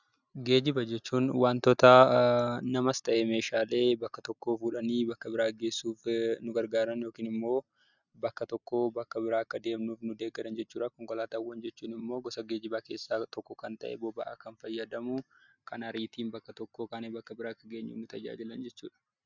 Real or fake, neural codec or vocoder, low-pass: real; none; 7.2 kHz